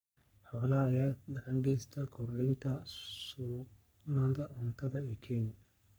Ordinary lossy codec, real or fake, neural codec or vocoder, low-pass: none; fake; codec, 44.1 kHz, 3.4 kbps, Pupu-Codec; none